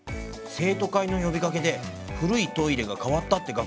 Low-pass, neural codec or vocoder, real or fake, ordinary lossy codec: none; none; real; none